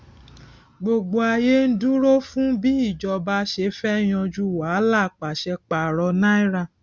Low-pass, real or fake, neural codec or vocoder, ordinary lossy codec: none; real; none; none